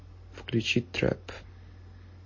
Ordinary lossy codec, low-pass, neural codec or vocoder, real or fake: MP3, 32 kbps; 7.2 kHz; none; real